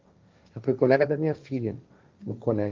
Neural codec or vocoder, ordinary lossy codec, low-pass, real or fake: codec, 16 kHz, 1.1 kbps, Voila-Tokenizer; Opus, 24 kbps; 7.2 kHz; fake